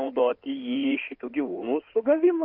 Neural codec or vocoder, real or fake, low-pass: codec, 16 kHz in and 24 kHz out, 2.2 kbps, FireRedTTS-2 codec; fake; 5.4 kHz